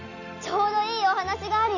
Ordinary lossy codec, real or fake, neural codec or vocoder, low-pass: none; real; none; 7.2 kHz